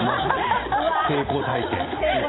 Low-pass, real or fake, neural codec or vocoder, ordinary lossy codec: 7.2 kHz; real; none; AAC, 16 kbps